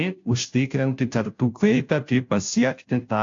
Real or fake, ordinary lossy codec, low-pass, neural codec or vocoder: fake; AAC, 48 kbps; 7.2 kHz; codec, 16 kHz, 0.5 kbps, FunCodec, trained on Chinese and English, 25 frames a second